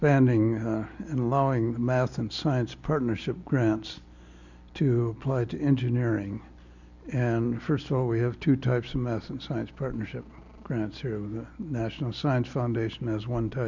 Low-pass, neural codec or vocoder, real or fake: 7.2 kHz; none; real